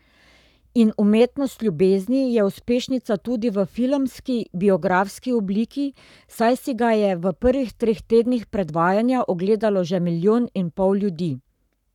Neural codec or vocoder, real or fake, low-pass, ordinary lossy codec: codec, 44.1 kHz, 7.8 kbps, Pupu-Codec; fake; 19.8 kHz; none